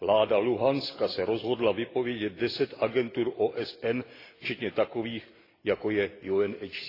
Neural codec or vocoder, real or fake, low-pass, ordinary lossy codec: none; real; 5.4 kHz; AAC, 24 kbps